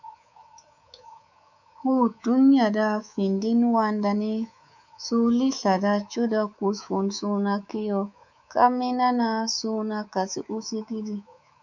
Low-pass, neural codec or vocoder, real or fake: 7.2 kHz; codec, 24 kHz, 3.1 kbps, DualCodec; fake